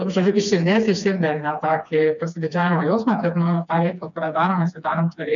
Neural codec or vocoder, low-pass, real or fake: codec, 16 kHz, 2 kbps, FreqCodec, smaller model; 7.2 kHz; fake